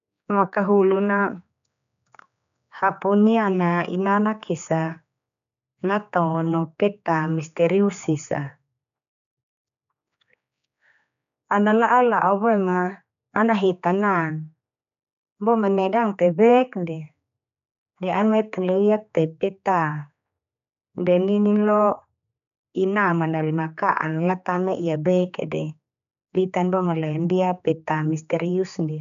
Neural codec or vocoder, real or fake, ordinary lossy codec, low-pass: codec, 16 kHz, 4 kbps, X-Codec, HuBERT features, trained on general audio; fake; none; 7.2 kHz